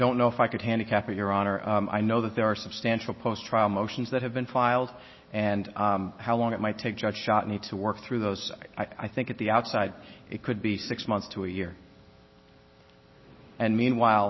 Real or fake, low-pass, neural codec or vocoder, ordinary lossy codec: real; 7.2 kHz; none; MP3, 24 kbps